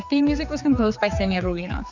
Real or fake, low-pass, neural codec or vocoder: fake; 7.2 kHz; codec, 16 kHz, 4 kbps, X-Codec, HuBERT features, trained on general audio